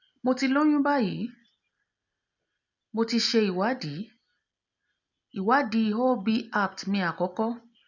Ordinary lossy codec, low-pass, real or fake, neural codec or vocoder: none; 7.2 kHz; real; none